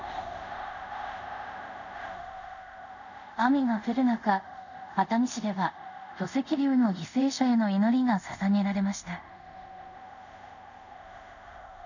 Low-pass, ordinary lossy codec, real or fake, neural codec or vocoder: 7.2 kHz; none; fake; codec, 24 kHz, 0.5 kbps, DualCodec